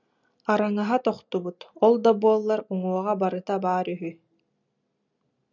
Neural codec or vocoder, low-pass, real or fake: none; 7.2 kHz; real